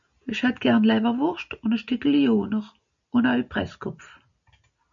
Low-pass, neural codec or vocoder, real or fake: 7.2 kHz; none; real